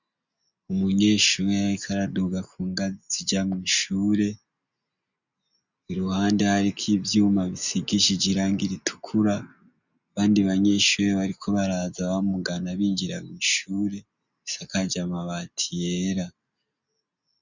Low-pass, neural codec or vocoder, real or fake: 7.2 kHz; none; real